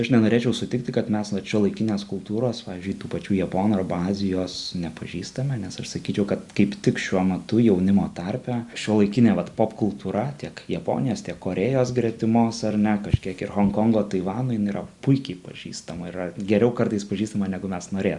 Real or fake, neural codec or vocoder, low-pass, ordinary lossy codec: real; none; 10.8 kHz; Opus, 64 kbps